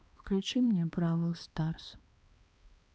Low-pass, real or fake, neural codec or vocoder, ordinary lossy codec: none; fake; codec, 16 kHz, 2 kbps, X-Codec, HuBERT features, trained on balanced general audio; none